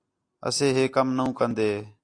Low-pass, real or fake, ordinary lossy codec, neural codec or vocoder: 9.9 kHz; real; AAC, 48 kbps; none